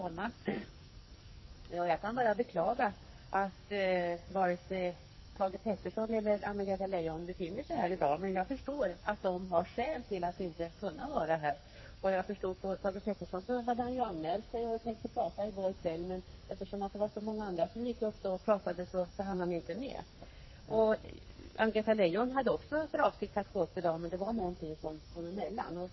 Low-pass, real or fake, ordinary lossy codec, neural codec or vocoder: 7.2 kHz; fake; MP3, 24 kbps; codec, 44.1 kHz, 2.6 kbps, SNAC